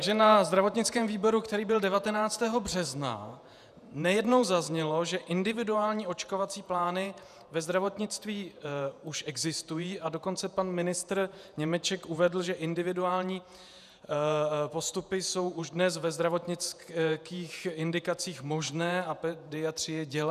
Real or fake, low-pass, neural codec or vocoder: fake; 14.4 kHz; vocoder, 48 kHz, 128 mel bands, Vocos